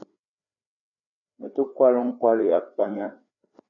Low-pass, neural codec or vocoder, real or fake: 7.2 kHz; codec, 16 kHz, 4 kbps, FreqCodec, larger model; fake